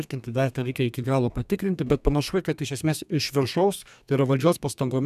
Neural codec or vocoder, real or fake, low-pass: codec, 32 kHz, 1.9 kbps, SNAC; fake; 14.4 kHz